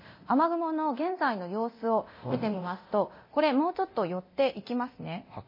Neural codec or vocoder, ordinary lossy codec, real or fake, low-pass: codec, 24 kHz, 0.9 kbps, DualCodec; MP3, 24 kbps; fake; 5.4 kHz